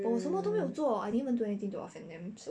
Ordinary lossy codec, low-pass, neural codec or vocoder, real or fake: none; 9.9 kHz; none; real